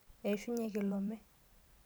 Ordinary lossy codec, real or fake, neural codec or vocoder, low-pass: none; fake; vocoder, 44.1 kHz, 128 mel bands every 512 samples, BigVGAN v2; none